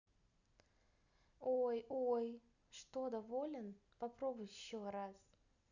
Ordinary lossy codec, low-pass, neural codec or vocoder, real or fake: none; 7.2 kHz; none; real